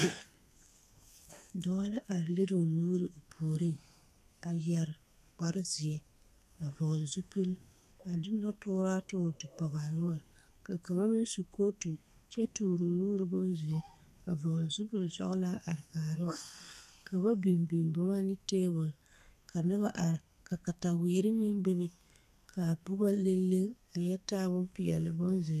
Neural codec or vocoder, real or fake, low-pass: codec, 32 kHz, 1.9 kbps, SNAC; fake; 14.4 kHz